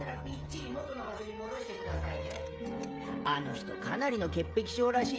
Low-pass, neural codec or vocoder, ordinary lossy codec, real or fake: none; codec, 16 kHz, 16 kbps, FreqCodec, smaller model; none; fake